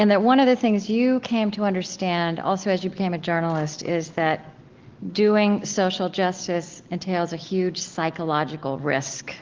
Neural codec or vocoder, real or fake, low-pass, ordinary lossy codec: none; real; 7.2 kHz; Opus, 16 kbps